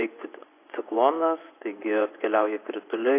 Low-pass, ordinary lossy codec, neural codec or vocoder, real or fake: 3.6 kHz; AAC, 24 kbps; vocoder, 24 kHz, 100 mel bands, Vocos; fake